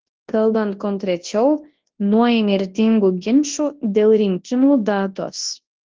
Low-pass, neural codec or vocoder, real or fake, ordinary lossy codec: 7.2 kHz; codec, 24 kHz, 0.9 kbps, WavTokenizer, large speech release; fake; Opus, 16 kbps